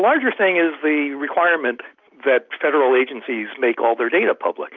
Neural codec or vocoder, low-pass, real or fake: none; 7.2 kHz; real